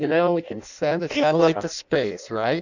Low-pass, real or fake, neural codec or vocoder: 7.2 kHz; fake; codec, 16 kHz in and 24 kHz out, 0.6 kbps, FireRedTTS-2 codec